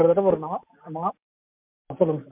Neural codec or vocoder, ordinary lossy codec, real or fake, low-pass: none; MP3, 32 kbps; real; 3.6 kHz